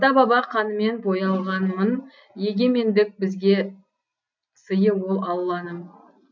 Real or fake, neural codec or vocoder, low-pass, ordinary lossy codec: real; none; 7.2 kHz; none